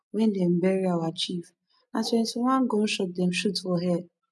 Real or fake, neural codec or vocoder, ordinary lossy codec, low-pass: real; none; none; none